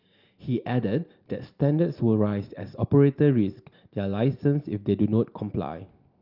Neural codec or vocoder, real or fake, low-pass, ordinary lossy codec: none; real; 5.4 kHz; Opus, 32 kbps